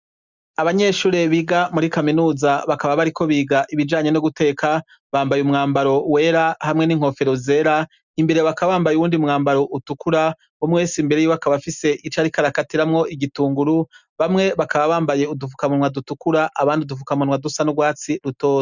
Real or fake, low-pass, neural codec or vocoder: real; 7.2 kHz; none